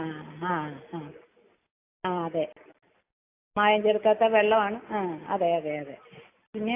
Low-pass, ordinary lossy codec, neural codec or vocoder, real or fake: 3.6 kHz; AAC, 24 kbps; none; real